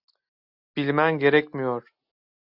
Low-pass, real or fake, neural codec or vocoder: 5.4 kHz; real; none